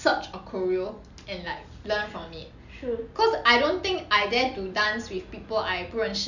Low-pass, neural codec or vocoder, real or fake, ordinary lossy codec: 7.2 kHz; none; real; none